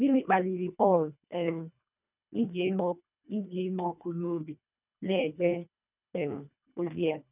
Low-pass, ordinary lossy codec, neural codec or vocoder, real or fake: 3.6 kHz; none; codec, 24 kHz, 1.5 kbps, HILCodec; fake